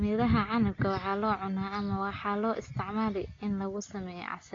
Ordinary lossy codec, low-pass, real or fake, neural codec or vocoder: AAC, 32 kbps; 7.2 kHz; real; none